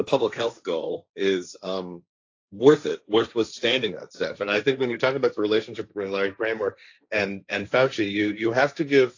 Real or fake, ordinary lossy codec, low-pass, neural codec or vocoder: fake; AAC, 48 kbps; 7.2 kHz; codec, 16 kHz, 1.1 kbps, Voila-Tokenizer